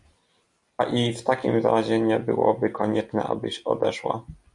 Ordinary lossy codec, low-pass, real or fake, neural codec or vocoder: MP3, 48 kbps; 10.8 kHz; real; none